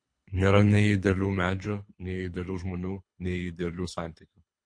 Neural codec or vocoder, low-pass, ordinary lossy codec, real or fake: codec, 24 kHz, 3 kbps, HILCodec; 9.9 kHz; MP3, 48 kbps; fake